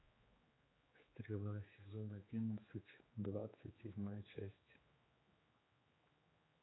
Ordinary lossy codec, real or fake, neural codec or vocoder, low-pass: AAC, 16 kbps; fake; codec, 16 kHz, 4 kbps, X-Codec, HuBERT features, trained on general audio; 7.2 kHz